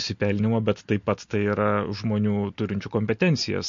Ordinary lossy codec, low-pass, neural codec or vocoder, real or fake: MP3, 48 kbps; 7.2 kHz; none; real